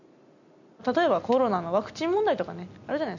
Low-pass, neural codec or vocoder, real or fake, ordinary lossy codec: 7.2 kHz; none; real; none